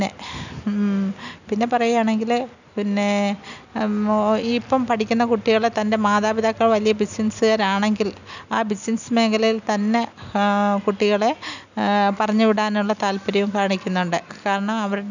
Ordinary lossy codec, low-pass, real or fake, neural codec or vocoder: none; 7.2 kHz; real; none